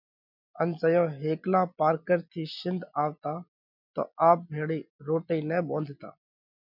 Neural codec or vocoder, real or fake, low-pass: none; real; 5.4 kHz